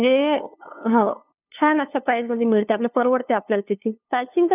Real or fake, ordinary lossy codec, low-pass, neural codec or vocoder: fake; none; 3.6 kHz; codec, 16 kHz, 2 kbps, FunCodec, trained on LibriTTS, 25 frames a second